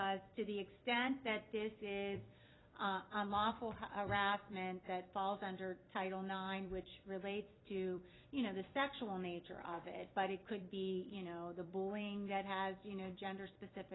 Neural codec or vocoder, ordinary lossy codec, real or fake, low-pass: vocoder, 44.1 kHz, 128 mel bands every 256 samples, BigVGAN v2; AAC, 16 kbps; fake; 7.2 kHz